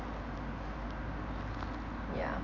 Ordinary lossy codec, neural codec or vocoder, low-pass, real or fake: none; none; 7.2 kHz; real